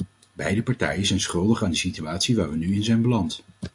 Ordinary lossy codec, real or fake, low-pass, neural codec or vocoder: AAC, 64 kbps; real; 10.8 kHz; none